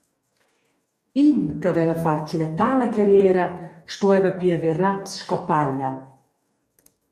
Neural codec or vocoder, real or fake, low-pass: codec, 44.1 kHz, 2.6 kbps, DAC; fake; 14.4 kHz